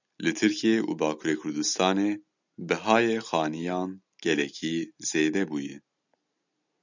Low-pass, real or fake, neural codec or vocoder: 7.2 kHz; real; none